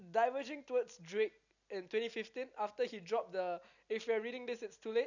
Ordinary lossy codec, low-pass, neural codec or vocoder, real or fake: none; 7.2 kHz; none; real